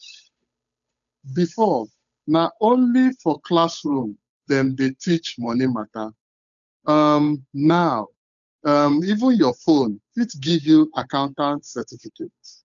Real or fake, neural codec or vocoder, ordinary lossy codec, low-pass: fake; codec, 16 kHz, 8 kbps, FunCodec, trained on Chinese and English, 25 frames a second; none; 7.2 kHz